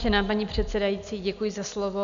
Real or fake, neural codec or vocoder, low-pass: real; none; 7.2 kHz